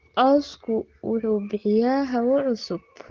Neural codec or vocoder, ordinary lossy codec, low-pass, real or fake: none; Opus, 16 kbps; 7.2 kHz; real